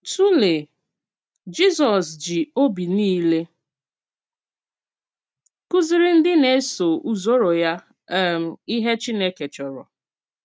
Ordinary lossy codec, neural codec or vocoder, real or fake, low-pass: none; none; real; none